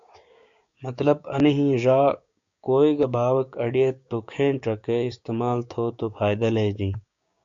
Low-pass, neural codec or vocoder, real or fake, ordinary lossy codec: 7.2 kHz; codec, 16 kHz, 6 kbps, DAC; fake; AAC, 64 kbps